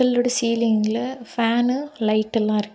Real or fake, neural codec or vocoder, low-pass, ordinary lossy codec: real; none; none; none